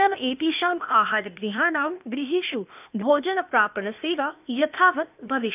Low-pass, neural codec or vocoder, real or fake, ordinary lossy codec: 3.6 kHz; codec, 16 kHz, 0.8 kbps, ZipCodec; fake; none